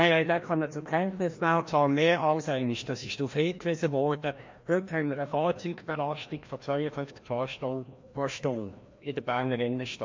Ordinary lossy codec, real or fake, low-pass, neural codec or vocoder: MP3, 48 kbps; fake; 7.2 kHz; codec, 16 kHz, 1 kbps, FreqCodec, larger model